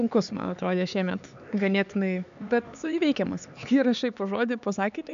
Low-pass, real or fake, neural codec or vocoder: 7.2 kHz; fake; codec, 16 kHz, 4 kbps, X-Codec, HuBERT features, trained on LibriSpeech